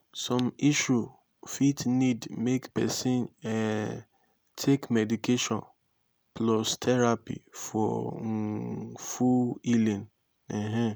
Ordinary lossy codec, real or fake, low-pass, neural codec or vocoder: none; real; none; none